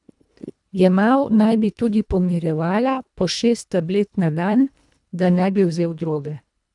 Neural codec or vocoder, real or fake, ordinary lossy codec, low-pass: codec, 24 kHz, 1.5 kbps, HILCodec; fake; none; 10.8 kHz